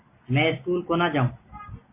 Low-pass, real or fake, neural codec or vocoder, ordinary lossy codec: 3.6 kHz; real; none; MP3, 24 kbps